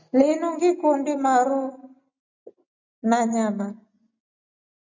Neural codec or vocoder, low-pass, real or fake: none; 7.2 kHz; real